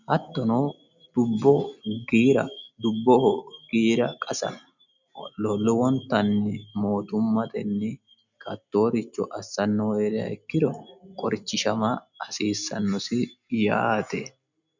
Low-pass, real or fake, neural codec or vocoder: 7.2 kHz; real; none